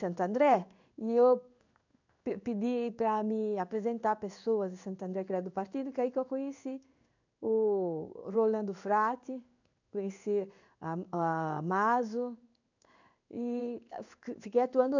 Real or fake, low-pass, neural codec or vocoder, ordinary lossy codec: fake; 7.2 kHz; codec, 16 kHz in and 24 kHz out, 1 kbps, XY-Tokenizer; none